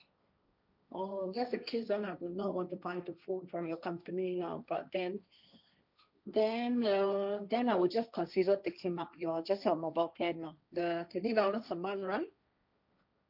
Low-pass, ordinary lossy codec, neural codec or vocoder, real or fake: 5.4 kHz; none; codec, 16 kHz, 1.1 kbps, Voila-Tokenizer; fake